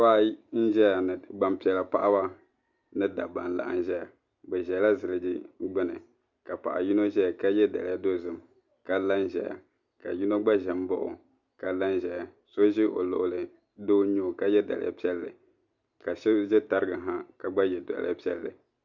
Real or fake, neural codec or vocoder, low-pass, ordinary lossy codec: real; none; 7.2 kHz; Opus, 64 kbps